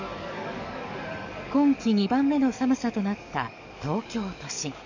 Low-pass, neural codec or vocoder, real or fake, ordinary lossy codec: 7.2 kHz; codec, 44.1 kHz, 7.8 kbps, DAC; fake; none